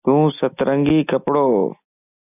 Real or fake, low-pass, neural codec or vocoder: real; 3.6 kHz; none